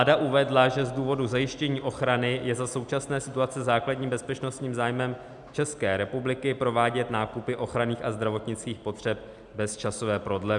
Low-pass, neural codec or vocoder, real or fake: 10.8 kHz; none; real